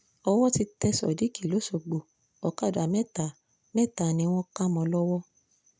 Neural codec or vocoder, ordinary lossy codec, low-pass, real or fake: none; none; none; real